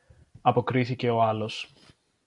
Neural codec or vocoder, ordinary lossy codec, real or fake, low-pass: none; MP3, 96 kbps; real; 10.8 kHz